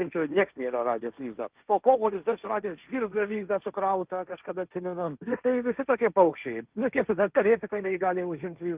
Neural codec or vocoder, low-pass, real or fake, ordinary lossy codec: codec, 16 kHz, 1.1 kbps, Voila-Tokenizer; 3.6 kHz; fake; Opus, 32 kbps